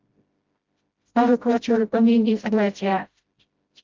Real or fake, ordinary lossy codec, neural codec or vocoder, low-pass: fake; Opus, 24 kbps; codec, 16 kHz, 0.5 kbps, FreqCodec, smaller model; 7.2 kHz